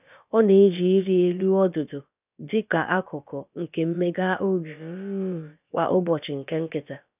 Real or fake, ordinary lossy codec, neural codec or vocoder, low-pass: fake; none; codec, 16 kHz, about 1 kbps, DyCAST, with the encoder's durations; 3.6 kHz